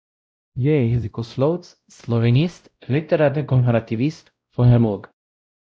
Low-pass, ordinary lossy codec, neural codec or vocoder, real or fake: none; none; codec, 16 kHz, 0.5 kbps, X-Codec, WavLM features, trained on Multilingual LibriSpeech; fake